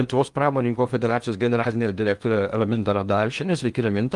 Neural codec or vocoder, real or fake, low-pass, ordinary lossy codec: codec, 16 kHz in and 24 kHz out, 0.8 kbps, FocalCodec, streaming, 65536 codes; fake; 10.8 kHz; Opus, 32 kbps